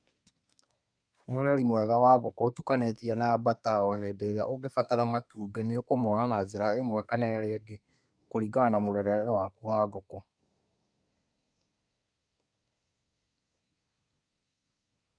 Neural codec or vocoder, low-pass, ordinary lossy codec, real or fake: codec, 24 kHz, 1 kbps, SNAC; 9.9 kHz; none; fake